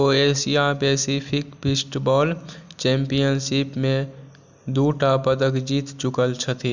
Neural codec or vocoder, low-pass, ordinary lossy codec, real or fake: none; 7.2 kHz; none; real